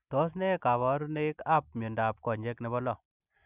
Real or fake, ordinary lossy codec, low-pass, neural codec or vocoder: real; none; 3.6 kHz; none